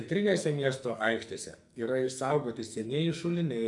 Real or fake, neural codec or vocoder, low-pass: fake; codec, 44.1 kHz, 2.6 kbps, SNAC; 10.8 kHz